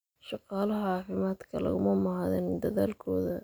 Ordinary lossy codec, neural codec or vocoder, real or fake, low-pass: none; none; real; none